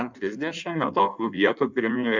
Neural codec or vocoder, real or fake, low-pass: codec, 16 kHz in and 24 kHz out, 1.1 kbps, FireRedTTS-2 codec; fake; 7.2 kHz